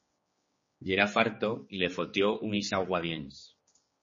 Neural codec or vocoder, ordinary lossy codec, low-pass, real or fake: codec, 16 kHz, 4 kbps, X-Codec, HuBERT features, trained on general audio; MP3, 32 kbps; 7.2 kHz; fake